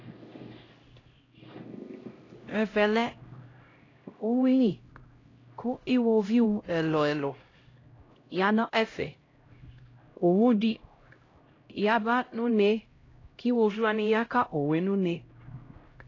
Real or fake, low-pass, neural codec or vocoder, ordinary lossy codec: fake; 7.2 kHz; codec, 16 kHz, 0.5 kbps, X-Codec, HuBERT features, trained on LibriSpeech; AAC, 32 kbps